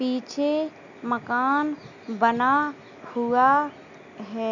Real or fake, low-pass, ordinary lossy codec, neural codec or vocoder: real; 7.2 kHz; none; none